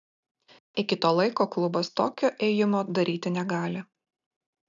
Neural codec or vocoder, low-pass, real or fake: none; 7.2 kHz; real